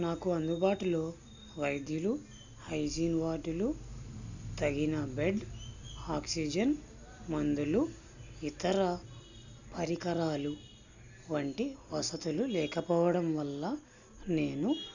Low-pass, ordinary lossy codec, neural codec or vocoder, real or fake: 7.2 kHz; none; none; real